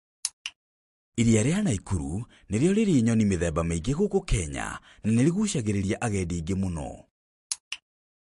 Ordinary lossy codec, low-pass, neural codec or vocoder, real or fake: MP3, 48 kbps; 14.4 kHz; none; real